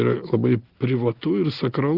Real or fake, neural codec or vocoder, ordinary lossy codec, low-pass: real; none; Opus, 16 kbps; 5.4 kHz